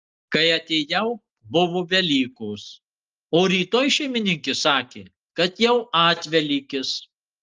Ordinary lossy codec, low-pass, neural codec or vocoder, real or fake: Opus, 32 kbps; 7.2 kHz; none; real